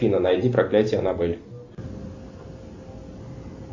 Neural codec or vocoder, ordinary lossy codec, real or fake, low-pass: none; Opus, 64 kbps; real; 7.2 kHz